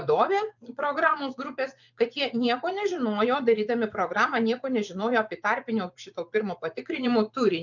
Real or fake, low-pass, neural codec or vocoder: fake; 7.2 kHz; vocoder, 24 kHz, 100 mel bands, Vocos